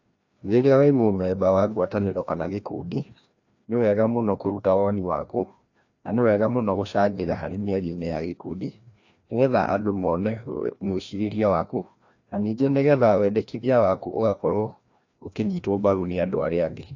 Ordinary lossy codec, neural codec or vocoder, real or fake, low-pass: AAC, 48 kbps; codec, 16 kHz, 1 kbps, FreqCodec, larger model; fake; 7.2 kHz